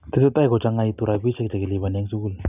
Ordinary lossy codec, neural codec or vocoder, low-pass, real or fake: none; none; 3.6 kHz; real